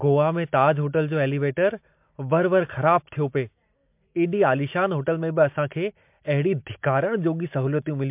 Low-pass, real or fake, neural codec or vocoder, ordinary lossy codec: 3.6 kHz; real; none; MP3, 32 kbps